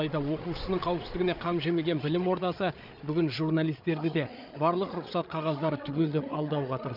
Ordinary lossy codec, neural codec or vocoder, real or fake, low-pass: none; codec, 16 kHz, 8 kbps, FreqCodec, larger model; fake; 5.4 kHz